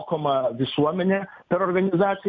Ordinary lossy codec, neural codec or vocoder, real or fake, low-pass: MP3, 48 kbps; none; real; 7.2 kHz